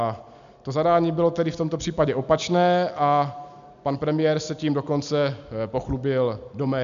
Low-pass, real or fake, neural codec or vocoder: 7.2 kHz; real; none